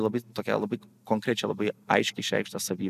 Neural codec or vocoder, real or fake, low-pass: none; real; 14.4 kHz